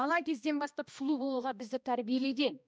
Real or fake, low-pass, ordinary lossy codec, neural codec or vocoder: fake; none; none; codec, 16 kHz, 1 kbps, X-Codec, HuBERT features, trained on balanced general audio